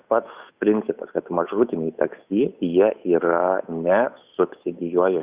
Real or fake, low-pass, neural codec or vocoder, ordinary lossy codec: fake; 3.6 kHz; codec, 16 kHz, 8 kbps, FunCodec, trained on Chinese and English, 25 frames a second; Opus, 64 kbps